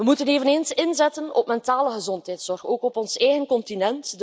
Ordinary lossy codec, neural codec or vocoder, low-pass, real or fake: none; none; none; real